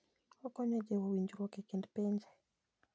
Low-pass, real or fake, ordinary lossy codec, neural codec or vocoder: none; real; none; none